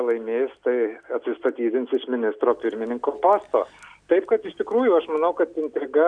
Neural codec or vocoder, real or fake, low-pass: none; real; 9.9 kHz